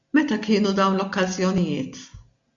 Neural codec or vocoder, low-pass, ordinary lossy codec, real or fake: none; 7.2 kHz; MP3, 64 kbps; real